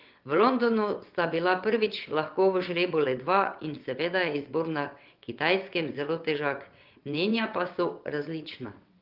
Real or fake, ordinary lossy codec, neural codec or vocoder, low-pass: fake; Opus, 32 kbps; vocoder, 22.05 kHz, 80 mel bands, WaveNeXt; 5.4 kHz